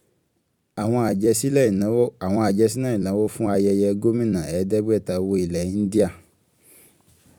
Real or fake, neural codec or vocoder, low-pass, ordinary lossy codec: fake; vocoder, 44.1 kHz, 128 mel bands every 256 samples, BigVGAN v2; 19.8 kHz; none